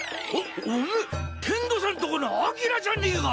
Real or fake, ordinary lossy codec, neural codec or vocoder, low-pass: real; none; none; none